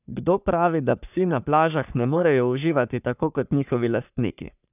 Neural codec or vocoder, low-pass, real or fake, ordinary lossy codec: codec, 44.1 kHz, 3.4 kbps, Pupu-Codec; 3.6 kHz; fake; none